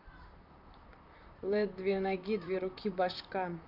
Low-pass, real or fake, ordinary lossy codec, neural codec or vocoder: 5.4 kHz; fake; none; vocoder, 44.1 kHz, 128 mel bands, Pupu-Vocoder